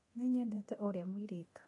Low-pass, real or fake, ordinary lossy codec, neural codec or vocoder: 10.8 kHz; fake; AAC, 32 kbps; codec, 24 kHz, 0.9 kbps, DualCodec